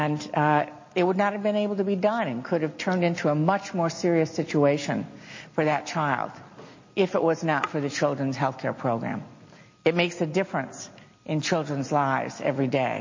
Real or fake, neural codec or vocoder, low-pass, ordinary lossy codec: real; none; 7.2 kHz; MP3, 32 kbps